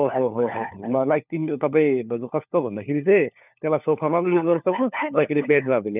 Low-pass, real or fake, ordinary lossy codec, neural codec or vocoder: 3.6 kHz; fake; none; codec, 16 kHz, 4 kbps, FunCodec, trained on LibriTTS, 50 frames a second